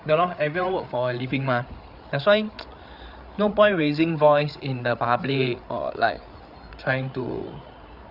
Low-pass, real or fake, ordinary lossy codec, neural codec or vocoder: 5.4 kHz; fake; Opus, 64 kbps; codec, 16 kHz, 8 kbps, FreqCodec, larger model